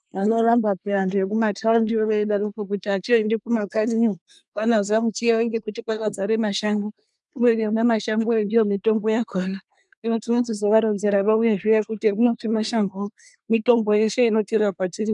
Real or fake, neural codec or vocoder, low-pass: fake; codec, 24 kHz, 1 kbps, SNAC; 10.8 kHz